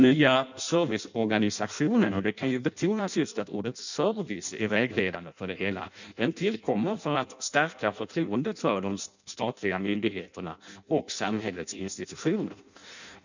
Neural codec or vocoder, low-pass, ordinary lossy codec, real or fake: codec, 16 kHz in and 24 kHz out, 0.6 kbps, FireRedTTS-2 codec; 7.2 kHz; none; fake